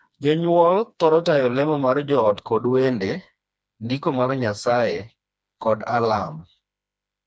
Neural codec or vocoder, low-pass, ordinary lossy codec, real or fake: codec, 16 kHz, 2 kbps, FreqCodec, smaller model; none; none; fake